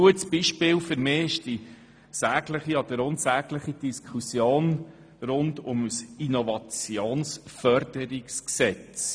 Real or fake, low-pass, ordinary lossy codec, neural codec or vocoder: real; 9.9 kHz; none; none